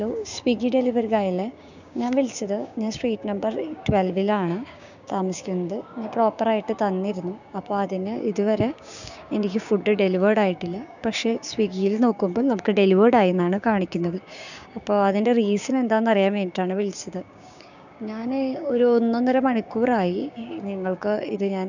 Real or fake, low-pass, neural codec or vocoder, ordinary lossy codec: fake; 7.2 kHz; codec, 16 kHz, 6 kbps, DAC; none